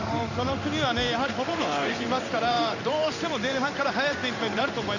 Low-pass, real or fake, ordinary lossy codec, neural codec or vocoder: 7.2 kHz; fake; none; codec, 16 kHz in and 24 kHz out, 1 kbps, XY-Tokenizer